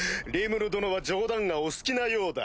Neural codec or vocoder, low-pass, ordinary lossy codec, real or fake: none; none; none; real